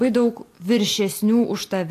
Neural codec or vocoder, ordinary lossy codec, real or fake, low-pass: none; AAC, 64 kbps; real; 14.4 kHz